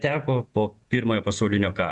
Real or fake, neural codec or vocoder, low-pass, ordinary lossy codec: fake; vocoder, 48 kHz, 128 mel bands, Vocos; 10.8 kHz; Opus, 64 kbps